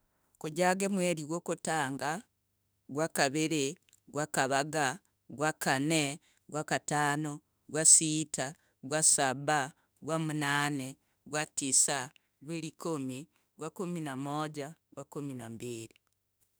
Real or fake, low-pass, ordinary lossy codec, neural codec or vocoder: fake; none; none; autoencoder, 48 kHz, 32 numbers a frame, DAC-VAE, trained on Japanese speech